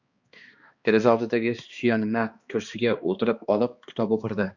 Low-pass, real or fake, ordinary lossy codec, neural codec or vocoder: 7.2 kHz; fake; Opus, 64 kbps; codec, 16 kHz, 2 kbps, X-Codec, HuBERT features, trained on balanced general audio